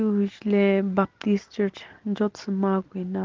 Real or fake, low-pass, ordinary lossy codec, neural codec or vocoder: real; 7.2 kHz; Opus, 16 kbps; none